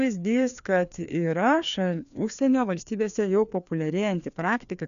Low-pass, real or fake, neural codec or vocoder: 7.2 kHz; fake; codec, 16 kHz, 2 kbps, FreqCodec, larger model